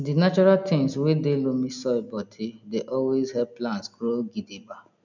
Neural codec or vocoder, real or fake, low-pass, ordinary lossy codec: none; real; 7.2 kHz; none